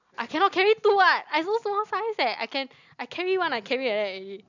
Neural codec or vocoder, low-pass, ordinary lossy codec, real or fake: none; 7.2 kHz; none; real